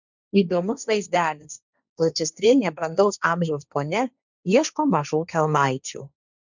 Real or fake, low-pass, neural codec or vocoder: fake; 7.2 kHz; codec, 16 kHz, 1.1 kbps, Voila-Tokenizer